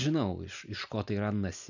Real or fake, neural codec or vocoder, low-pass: real; none; 7.2 kHz